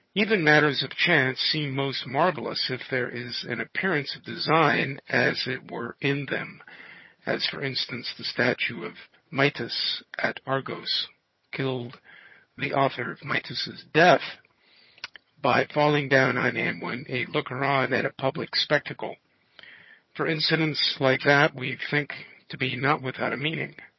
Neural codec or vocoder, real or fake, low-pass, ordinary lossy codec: vocoder, 22.05 kHz, 80 mel bands, HiFi-GAN; fake; 7.2 kHz; MP3, 24 kbps